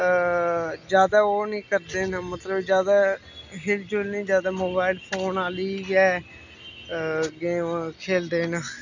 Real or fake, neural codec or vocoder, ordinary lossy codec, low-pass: real; none; none; 7.2 kHz